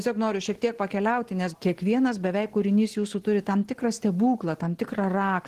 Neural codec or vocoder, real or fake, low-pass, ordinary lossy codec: none; real; 14.4 kHz; Opus, 16 kbps